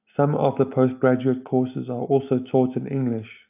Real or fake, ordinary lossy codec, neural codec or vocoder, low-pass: real; AAC, 32 kbps; none; 3.6 kHz